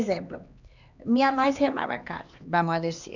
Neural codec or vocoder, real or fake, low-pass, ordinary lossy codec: codec, 16 kHz, 2 kbps, X-Codec, HuBERT features, trained on LibriSpeech; fake; 7.2 kHz; none